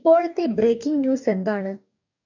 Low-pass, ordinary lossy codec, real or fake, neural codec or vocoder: 7.2 kHz; none; fake; codec, 44.1 kHz, 2.6 kbps, DAC